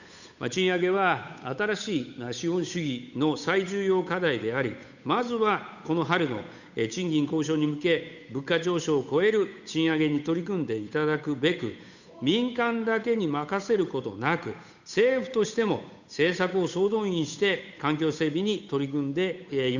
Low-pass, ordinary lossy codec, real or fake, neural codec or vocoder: 7.2 kHz; none; fake; codec, 16 kHz, 8 kbps, FunCodec, trained on Chinese and English, 25 frames a second